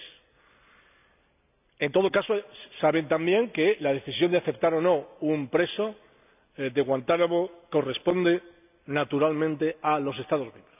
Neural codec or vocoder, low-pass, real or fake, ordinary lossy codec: none; 3.6 kHz; real; none